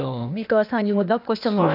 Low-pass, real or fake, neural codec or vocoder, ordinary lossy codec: 5.4 kHz; fake; codec, 16 kHz, 0.8 kbps, ZipCodec; none